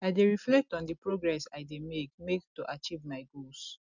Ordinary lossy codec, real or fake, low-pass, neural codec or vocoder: none; real; 7.2 kHz; none